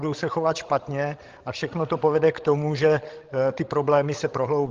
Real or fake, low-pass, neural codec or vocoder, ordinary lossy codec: fake; 7.2 kHz; codec, 16 kHz, 16 kbps, FreqCodec, larger model; Opus, 16 kbps